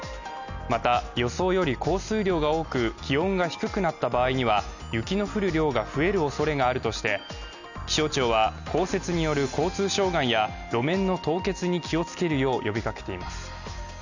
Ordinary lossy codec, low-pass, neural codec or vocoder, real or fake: none; 7.2 kHz; none; real